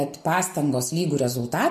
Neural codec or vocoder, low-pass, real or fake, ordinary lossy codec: none; 14.4 kHz; real; AAC, 96 kbps